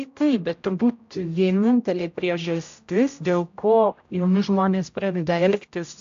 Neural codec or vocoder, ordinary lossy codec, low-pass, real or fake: codec, 16 kHz, 0.5 kbps, X-Codec, HuBERT features, trained on general audio; AAC, 64 kbps; 7.2 kHz; fake